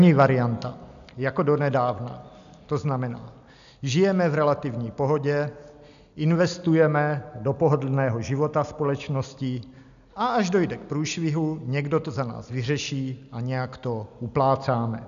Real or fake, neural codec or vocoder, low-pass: real; none; 7.2 kHz